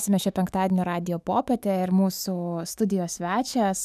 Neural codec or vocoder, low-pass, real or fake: autoencoder, 48 kHz, 128 numbers a frame, DAC-VAE, trained on Japanese speech; 14.4 kHz; fake